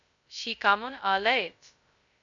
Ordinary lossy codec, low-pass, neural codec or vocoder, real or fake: MP3, 64 kbps; 7.2 kHz; codec, 16 kHz, 0.2 kbps, FocalCodec; fake